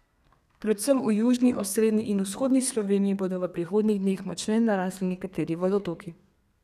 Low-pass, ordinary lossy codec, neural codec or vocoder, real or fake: 14.4 kHz; none; codec, 32 kHz, 1.9 kbps, SNAC; fake